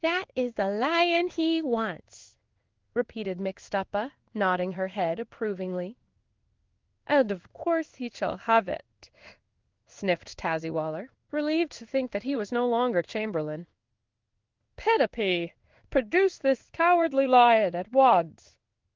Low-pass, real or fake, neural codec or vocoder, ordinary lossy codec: 7.2 kHz; fake; codec, 16 kHz in and 24 kHz out, 1 kbps, XY-Tokenizer; Opus, 32 kbps